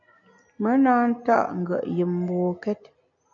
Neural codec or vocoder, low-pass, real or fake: none; 7.2 kHz; real